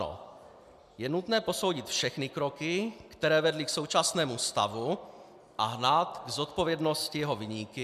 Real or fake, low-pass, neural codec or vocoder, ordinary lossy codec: real; 14.4 kHz; none; MP3, 96 kbps